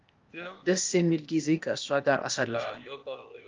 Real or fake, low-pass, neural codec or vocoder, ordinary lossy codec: fake; 7.2 kHz; codec, 16 kHz, 0.8 kbps, ZipCodec; Opus, 24 kbps